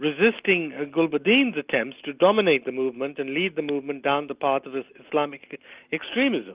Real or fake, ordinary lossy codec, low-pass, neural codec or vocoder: real; Opus, 24 kbps; 3.6 kHz; none